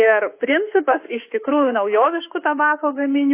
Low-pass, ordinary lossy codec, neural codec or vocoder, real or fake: 3.6 kHz; AAC, 24 kbps; autoencoder, 48 kHz, 32 numbers a frame, DAC-VAE, trained on Japanese speech; fake